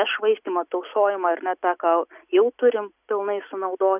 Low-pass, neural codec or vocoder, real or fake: 3.6 kHz; none; real